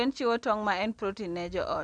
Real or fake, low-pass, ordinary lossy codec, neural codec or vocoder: real; 9.9 kHz; none; none